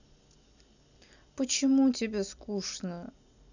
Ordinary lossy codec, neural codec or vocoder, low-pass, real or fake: none; none; 7.2 kHz; real